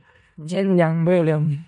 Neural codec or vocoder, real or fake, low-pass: codec, 16 kHz in and 24 kHz out, 0.4 kbps, LongCat-Audio-Codec, four codebook decoder; fake; 10.8 kHz